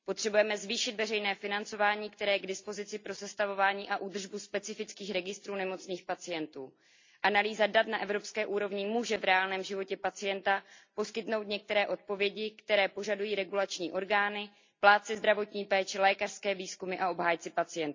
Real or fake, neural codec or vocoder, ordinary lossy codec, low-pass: real; none; MP3, 64 kbps; 7.2 kHz